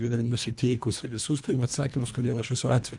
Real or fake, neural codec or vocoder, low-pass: fake; codec, 24 kHz, 1.5 kbps, HILCodec; 10.8 kHz